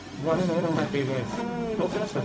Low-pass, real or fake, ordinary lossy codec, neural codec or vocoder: none; fake; none; codec, 16 kHz, 0.4 kbps, LongCat-Audio-Codec